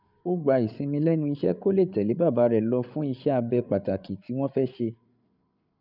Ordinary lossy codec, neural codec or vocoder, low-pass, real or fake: none; codec, 16 kHz, 8 kbps, FreqCodec, larger model; 5.4 kHz; fake